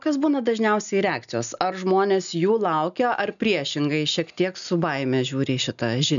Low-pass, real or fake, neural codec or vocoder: 7.2 kHz; real; none